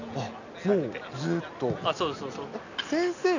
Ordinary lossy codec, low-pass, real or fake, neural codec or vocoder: none; 7.2 kHz; real; none